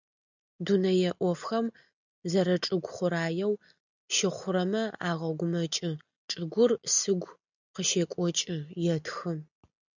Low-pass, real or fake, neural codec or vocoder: 7.2 kHz; real; none